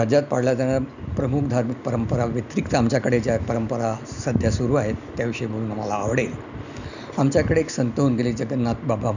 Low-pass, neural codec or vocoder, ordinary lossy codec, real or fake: 7.2 kHz; none; none; real